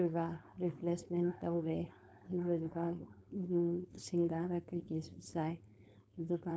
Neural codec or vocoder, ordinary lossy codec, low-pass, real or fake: codec, 16 kHz, 4.8 kbps, FACodec; none; none; fake